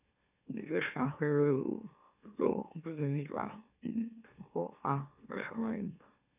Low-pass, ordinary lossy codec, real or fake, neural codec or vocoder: 3.6 kHz; none; fake; autoencoder, 44.1 kHz, a latent of 192 numbers a frame, MeloTTS